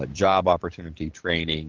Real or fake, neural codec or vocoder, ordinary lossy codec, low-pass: fake; vocoder, 44.1 kHz, 128 mel bands every 512 samples, BigVGAN v2; Opus, 16 kbps; 7.2 kHz